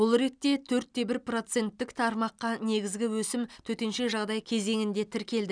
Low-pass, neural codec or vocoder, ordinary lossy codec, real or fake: 9.9 kHz; none; none; real